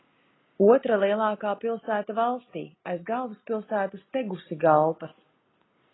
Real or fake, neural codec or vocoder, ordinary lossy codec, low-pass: real; none; AAC, 16 kbps; 7.2 kHz